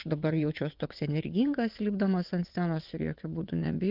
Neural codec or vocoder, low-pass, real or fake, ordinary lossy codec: vocoder, 22.05 kHz, 80 mel bands, WaveNeXt; 5.4 kHz; fake; Opus, 24 kbps